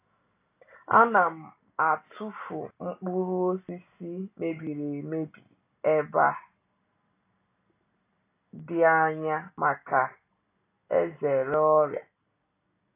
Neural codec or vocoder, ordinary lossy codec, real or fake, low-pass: none; AAC, 24 kbps; real; 3.6 kHz